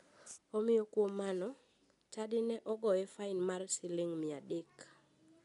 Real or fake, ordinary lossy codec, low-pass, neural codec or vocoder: real; none; 10.8 kHz; none